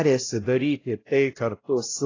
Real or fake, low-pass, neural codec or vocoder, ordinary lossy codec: fake; 7.2 kHz; codec, 16 kHz, 0.5 kbps, X-Codec, WavLM features, trained on Multilingual LibriSpeech; AAC, 32 kbps